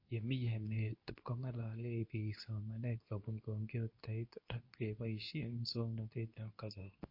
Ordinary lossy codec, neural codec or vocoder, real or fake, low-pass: MP3, 32 kbps; codec, 24 kHz, 0.9 kbps, WavTokenizer, medium speech release version 2; fake; 5.4 kHz